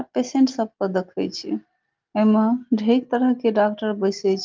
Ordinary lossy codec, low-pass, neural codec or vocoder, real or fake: Opus, 32 kbps; 7.2 kHz; none; real